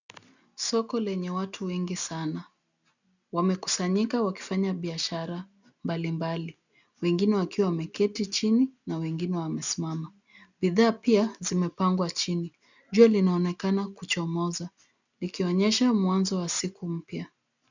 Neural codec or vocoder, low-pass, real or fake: none; 7.2 kHz; real